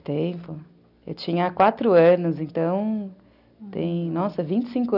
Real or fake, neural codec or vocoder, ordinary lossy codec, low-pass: real; none; none; 5.4 kHz